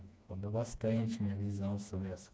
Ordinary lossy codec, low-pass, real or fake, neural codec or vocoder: none; none; fake; codec, 16 kHz, 2 kbps, FreqCodec, smaller model